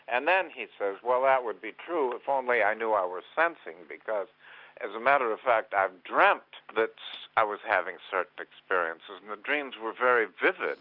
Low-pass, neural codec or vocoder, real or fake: 5.4 kHz; none; real